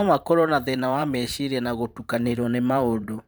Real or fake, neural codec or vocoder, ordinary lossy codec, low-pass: fake; vocoder, 44.1 kHz, 128 mel bands every 512 samples, BigVGAN v2; none; none